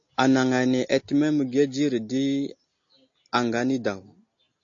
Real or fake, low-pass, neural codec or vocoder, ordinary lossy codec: real; 7.2 kHz; none; AAC, 48 kbps